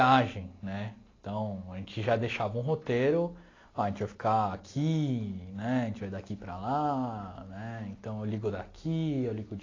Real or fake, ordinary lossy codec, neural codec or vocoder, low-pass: real; AAC, 32 kbps; none; 7.2 kHz